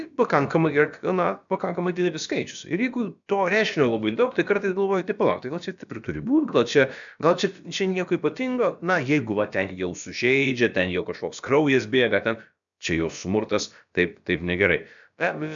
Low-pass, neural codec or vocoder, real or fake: 7.2 kHz; codec, 16 kHz, about 1 kbps, DyCAST, with the encoder's durations; fake